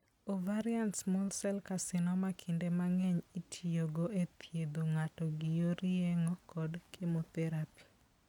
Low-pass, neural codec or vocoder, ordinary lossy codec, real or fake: 19.8 kHz; none; none; real